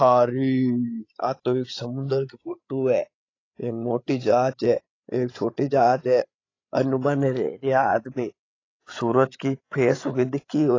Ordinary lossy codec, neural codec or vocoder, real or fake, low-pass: AAC, 32 kbps; codec, 16 kHz, 16 kbps, FreqCodec, larger model; fake; 7.2 kHz